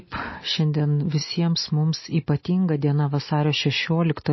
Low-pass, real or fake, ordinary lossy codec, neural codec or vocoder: 7.2 kHz; real; MP3, 24 kbps; none